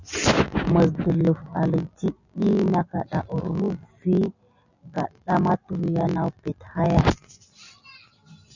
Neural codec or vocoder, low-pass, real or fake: none; 7.2 kHz; real